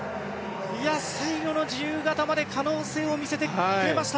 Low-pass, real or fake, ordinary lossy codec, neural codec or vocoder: none; real; none; none